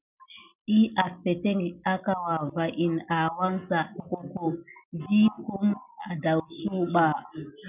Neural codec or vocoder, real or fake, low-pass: none; real; 3.6 kHz